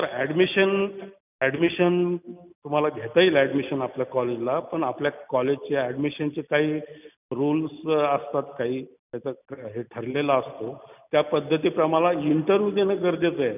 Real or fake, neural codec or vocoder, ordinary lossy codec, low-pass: real; none; none; 3.6 kHz